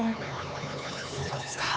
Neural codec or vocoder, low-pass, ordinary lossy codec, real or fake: codec, 16 kHz, 4 kbps, X-Codec, HuBERT features, trained on LibriSpeech; none; none; fake